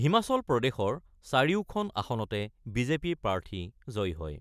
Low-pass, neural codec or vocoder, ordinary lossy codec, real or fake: 14.4 kHz; none; none; real